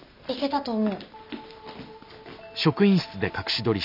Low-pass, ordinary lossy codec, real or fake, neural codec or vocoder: 5.4 kHz; none; real; none